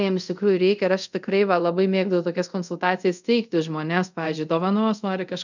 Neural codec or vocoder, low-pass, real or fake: codec, 24 kHz, 0.5 kbps, DualCodec; 7.2 kHz; fake